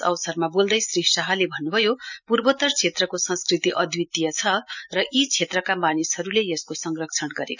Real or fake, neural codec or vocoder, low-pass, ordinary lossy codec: real; none; 7.2 kHz; none